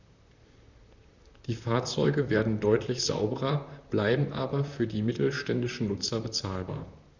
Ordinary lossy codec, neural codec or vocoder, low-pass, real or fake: none; vocoder, 44.1 kHz, 128 mel bands, Pupu-Vocoder; 7.2 kHz; fake